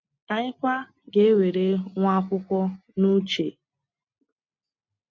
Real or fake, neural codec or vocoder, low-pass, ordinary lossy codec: real; none; 7.2 kHz; MP3, 48 kbps